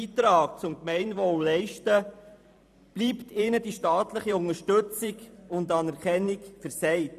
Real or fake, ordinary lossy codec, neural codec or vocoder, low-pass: fake; none; vocoder, 44.1 kHz, 128 mel bands every 512 samples, BigVGAN v2; 14.4 kHz